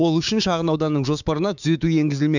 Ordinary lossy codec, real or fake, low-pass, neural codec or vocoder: none; fake; 7.2 kHz; codec, 16 kHz, 6 kbps, DAC